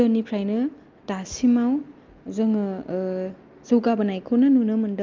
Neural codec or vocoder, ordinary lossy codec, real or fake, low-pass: none; Opus, 24 kbps; real; 7.2 kHz